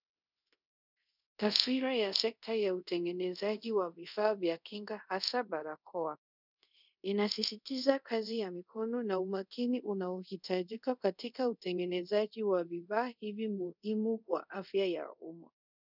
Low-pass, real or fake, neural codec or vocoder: 5.4 kHz; fake; codec, 24 kHz, 0.5 kbps, DualCodec